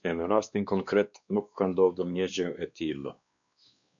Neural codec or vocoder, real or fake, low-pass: codec, 16 kHz, 2 kbps, X-Codec, WavLM features, trained on Multilingual LibriSpeech; fake; 7.2 kHz